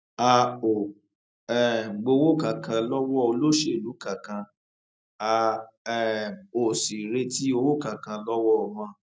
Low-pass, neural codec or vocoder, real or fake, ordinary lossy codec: none; none; real; none